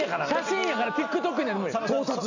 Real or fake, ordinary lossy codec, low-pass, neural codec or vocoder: real; none; 7.2 kHz; none